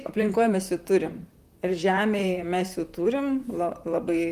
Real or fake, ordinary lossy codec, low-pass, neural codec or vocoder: fake; Opus, 32 kbps; 14.4 kHz; vocoder, 44.1 kHz, 128 mel bands, Pupu-Vocoder